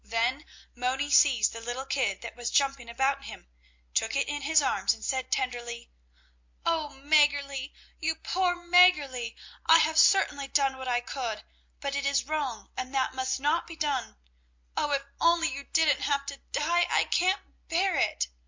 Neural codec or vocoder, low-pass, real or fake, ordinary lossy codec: none; 7.2 kHz; real; MP3, 48 kbps